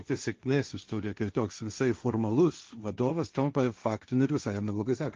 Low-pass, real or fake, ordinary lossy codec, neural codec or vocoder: 7.2 kHz; fake; Opus, 32 kbps; codec, 16 kHz, 1.1 kbps, Voila-Tokenizer